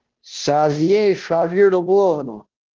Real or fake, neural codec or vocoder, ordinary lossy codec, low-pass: fake; codec, 16 kHz, 0.5 kbps, FunCodec, trained on Chinese and English, 25 frames a second; Opus, 16 kbps; 7.2 kHz